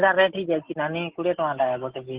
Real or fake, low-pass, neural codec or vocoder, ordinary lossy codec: real; 3.6 kHz; none; Opus, 16 kbps